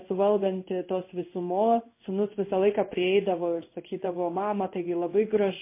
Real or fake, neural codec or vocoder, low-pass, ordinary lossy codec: fake; codec, 16 kHz in and 24 kHz out, 1 kbps, XY-Tokenizer; 3.6 kHz; MP3, 24 kbps